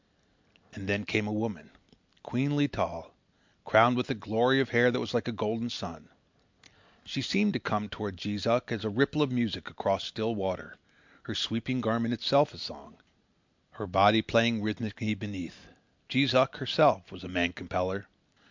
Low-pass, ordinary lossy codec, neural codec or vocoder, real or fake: 7.2 kHz; MP3, 64 kbps; none; real